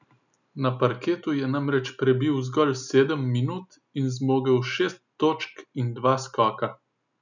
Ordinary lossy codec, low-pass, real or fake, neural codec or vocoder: none; 7.2 kHz; real; none